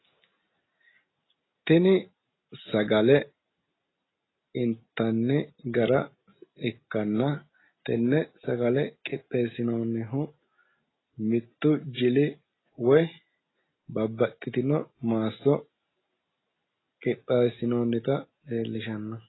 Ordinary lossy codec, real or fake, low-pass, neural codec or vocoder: AAC, 16 kbps; real; 7.2 kHz; none